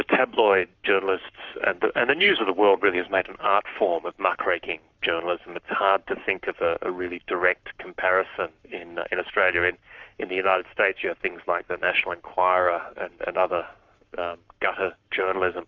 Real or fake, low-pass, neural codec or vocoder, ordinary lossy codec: fake; 7.2 kHz; codec, 44.1 kHz, 7.8 kbps, Pupu-Codec; Opus, 64 kbps